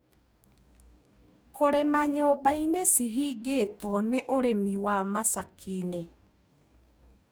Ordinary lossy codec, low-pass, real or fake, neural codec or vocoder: none; none; fake; codec, 44.1 kHz, 2.6 kbps, DAC